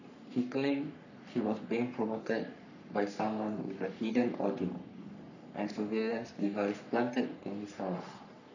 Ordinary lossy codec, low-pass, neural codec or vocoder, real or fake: none; 7.2 kHz; codec, 44.1 kHz, 3.4 kbps, Pupu-Codec; fake